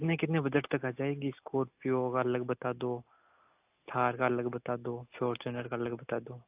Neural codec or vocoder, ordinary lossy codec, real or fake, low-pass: none; AAC, 32 kbps; real; 3.6 kHz